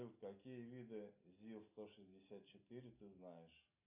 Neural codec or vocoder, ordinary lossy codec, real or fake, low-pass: none; AAC, 24 kbps; real; 3.6 kHz